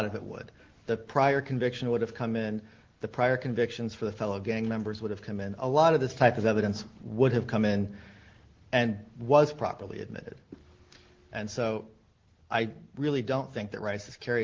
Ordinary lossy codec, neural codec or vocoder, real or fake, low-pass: Opus, 16 kbps; none; real; 7.2 kHz